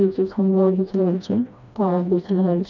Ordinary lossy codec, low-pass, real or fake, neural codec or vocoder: none; 7.2 kHz; fake; codec, 16 kHz, 1 kbps, FreqCodec, smaller model